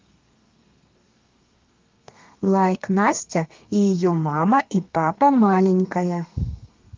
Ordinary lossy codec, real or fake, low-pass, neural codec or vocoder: Opus, 24 kbps; fake; 7.2 kHz; codec, 32 kHz, 1.9 kbps, SNAC